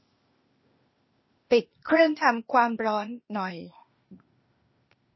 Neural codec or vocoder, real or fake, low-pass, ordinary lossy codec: codec, 16 kHz, 0.8 kbps, ZipCodec; fake; 7.2 kHz; MP3, 24 kbps